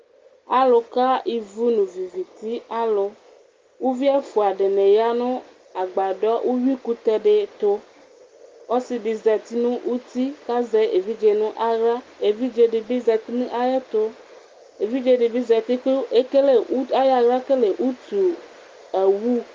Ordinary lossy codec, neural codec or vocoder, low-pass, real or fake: Opus, 32 kbps; none; 7.2 kHz; real